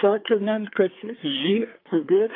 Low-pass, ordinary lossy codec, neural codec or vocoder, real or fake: 5.4 kHz; AAC, 32 kbps; codec, 24 kHz, 1 kbps, SNAC; fake